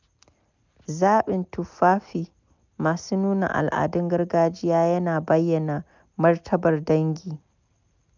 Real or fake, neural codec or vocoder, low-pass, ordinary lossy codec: real; none; 7.2 kHz; none